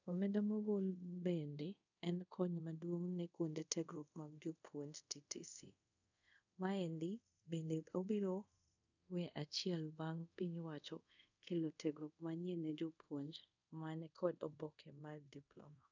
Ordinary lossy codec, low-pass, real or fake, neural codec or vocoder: none; 7.2 kHz; fake; codec, 24 kHz, 0.5 kbps, DualCodec